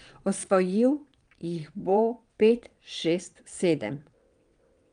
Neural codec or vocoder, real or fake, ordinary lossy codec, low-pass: vocoder, 22.05 kHz, 80 mel bands, WaveNeXt; fake; Opus, 32 kbps; 9.9 kHz